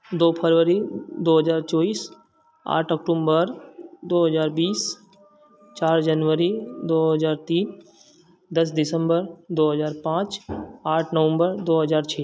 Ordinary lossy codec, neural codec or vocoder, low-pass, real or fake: none; none; none; real